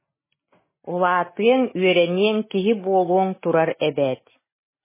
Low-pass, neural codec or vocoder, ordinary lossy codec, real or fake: 3.6 kHz; none; MP3, 16 kbps; real